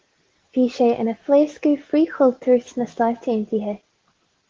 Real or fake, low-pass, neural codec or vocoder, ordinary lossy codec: real; 7.2 kHz; none; Opus, 16 kbps